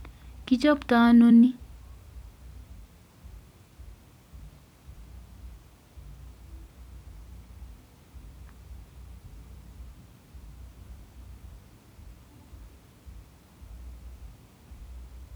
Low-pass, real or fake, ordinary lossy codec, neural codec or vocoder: none; fake; none; vocoder, 44.1 kHz, 128 mel bands every 256 samples, BigVGAN v2